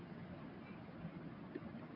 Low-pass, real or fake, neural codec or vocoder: 5.4 kHz; real; none